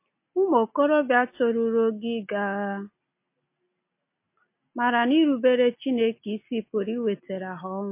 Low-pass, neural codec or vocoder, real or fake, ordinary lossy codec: 3.6 kHz; none; real; MP3, 24 kbps